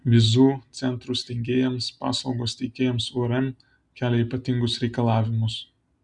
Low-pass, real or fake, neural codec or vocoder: 10.8 kHz; real; none